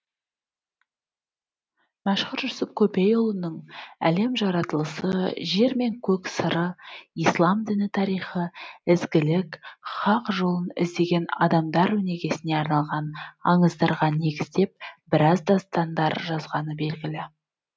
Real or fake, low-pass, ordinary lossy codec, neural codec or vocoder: real; none; none; none